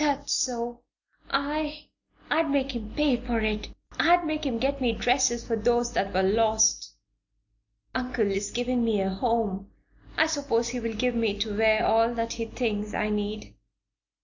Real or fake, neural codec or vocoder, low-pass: real; none; 7.2 kHz